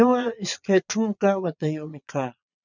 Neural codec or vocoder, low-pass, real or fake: vocoder, 22.05 kHz, 80 mel bands, Vocos; 7.2 kHz; fake